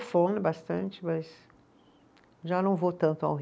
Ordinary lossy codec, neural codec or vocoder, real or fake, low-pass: none; none; real; none